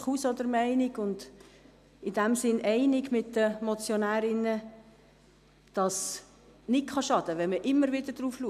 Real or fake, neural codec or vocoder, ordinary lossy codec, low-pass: real; none; none; 14.4 kHz